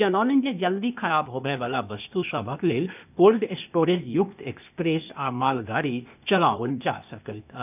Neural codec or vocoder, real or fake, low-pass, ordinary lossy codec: codec, 16 kHz, 0.8 kbps, ZipCodec; fake; 3.6 kHz; none